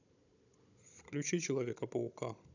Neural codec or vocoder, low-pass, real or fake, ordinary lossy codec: codec, 16 kHz, 16 kbps, FunCodec, trained on Chinese and English, 50 frames a second; 7.2 kHz; fake; none